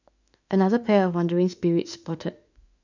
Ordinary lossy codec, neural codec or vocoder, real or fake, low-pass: none; autoencoder, 48 kHz, 32 numbers a frame, DAC-VAE, trained on Japanese speech; fake; 7.2 kHz